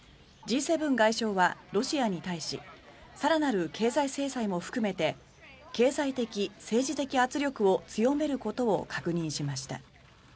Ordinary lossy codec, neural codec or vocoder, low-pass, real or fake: none; none; none; real